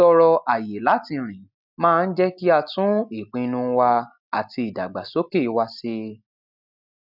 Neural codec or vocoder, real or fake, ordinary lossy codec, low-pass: none; real; none; 5.4 kHz